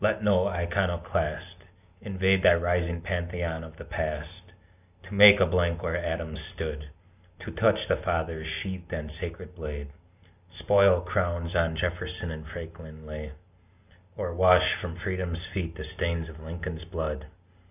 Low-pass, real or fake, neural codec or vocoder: 3.6 kHz; real; none